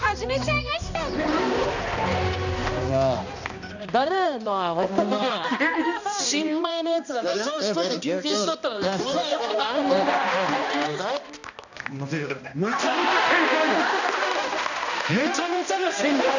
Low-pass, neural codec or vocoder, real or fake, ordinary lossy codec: 7.2 kHz; codec, 16 kHz, 1 kbps, X-Codec, HuBERT features, trained on balanced general audio; fake; none